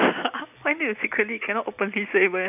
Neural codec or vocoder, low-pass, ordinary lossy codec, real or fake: none; 3.6 kHz; none; real